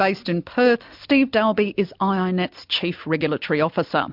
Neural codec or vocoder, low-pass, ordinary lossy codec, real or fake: none; 5.4 kHz; MP3, 48 kbps; real